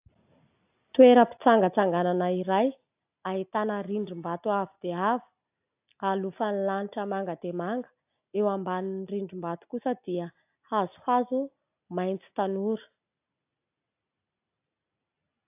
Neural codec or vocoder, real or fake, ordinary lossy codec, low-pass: none; real; AAC, 32 kbps; 3.6 kHz